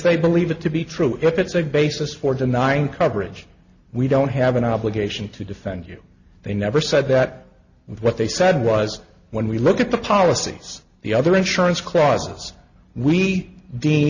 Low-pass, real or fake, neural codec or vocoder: 7.2 kHz; real; none